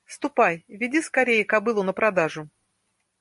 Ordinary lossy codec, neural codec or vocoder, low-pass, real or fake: MP3, 48 kbps; none; 14.4 kHz; real